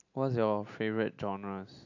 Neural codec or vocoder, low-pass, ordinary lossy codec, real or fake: none; 7.2 kHz; AAC, 48 kbps; real